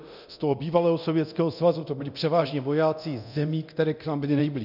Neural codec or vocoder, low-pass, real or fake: codec, 24 kHz, 0.9 kbps, DualCodec; 5.4 kHz; fake